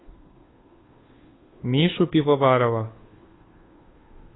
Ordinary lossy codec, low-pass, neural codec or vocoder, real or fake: AAC, 16 kbps; 7.2 kHz; codec, 16 kHz, 2 kbps, FunCodec, trained on Chinese and English, 25 frames a second; fake